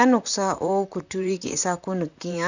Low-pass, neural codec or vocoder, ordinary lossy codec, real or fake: 7.2 kHz; vocoder, 44.1 kHz, 128 mel bands, Pupu-Vocoder; none; fake